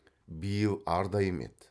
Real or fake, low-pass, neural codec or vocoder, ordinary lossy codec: real; none; none; none